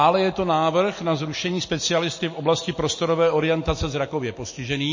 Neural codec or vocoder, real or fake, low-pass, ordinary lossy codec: none; real; 7.2 kHz; MP3, 32 kbps